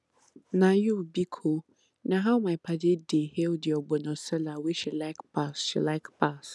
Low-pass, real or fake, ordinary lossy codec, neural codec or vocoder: none; real; none; none